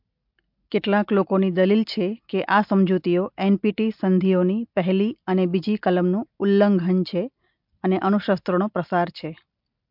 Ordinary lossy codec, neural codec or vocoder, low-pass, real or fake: MP3, 48 kbps; none; 5.4 kHz; real